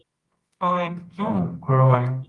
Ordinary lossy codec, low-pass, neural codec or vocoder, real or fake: Opus, 24 kbps; 10.8 kHz; codec, 24 kHz, 0.9 kbps, WavTokenizer, medium music audio release; fake